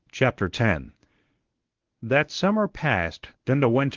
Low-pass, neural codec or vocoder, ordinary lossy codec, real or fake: 7.2 kHz; codec, 24 kHz, 0.9 kbps, WavTokenizer, medium speech release version 1; Opus, 16 kbps; fake